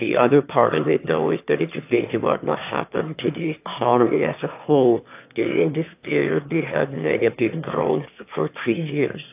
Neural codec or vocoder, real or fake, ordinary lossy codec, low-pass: autoencoder, 22.05 kHz, a latent of 192 numbers a frame, VITS, trained on one speaker; fake; AAC, 32 kbps; 3.6 kHz